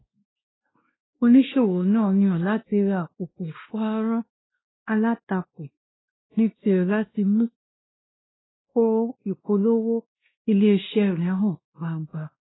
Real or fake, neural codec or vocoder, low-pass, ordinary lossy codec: fake; codec, 16 kHz, 1 kbps, X-Codec, WavLM features, trained on Multilingual LibriSpeech; 7.2 kHz; AAC, 16 kbps